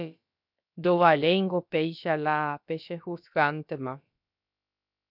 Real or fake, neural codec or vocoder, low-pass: fake; codec, 16 kHz, about 1 kbps, DyCAST, with the encoder's durations; 5.4 kHz